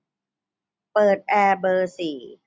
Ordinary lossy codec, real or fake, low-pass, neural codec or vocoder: none; real; none; none